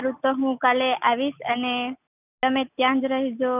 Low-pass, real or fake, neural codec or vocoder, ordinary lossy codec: 3.6 kHz; real; none; none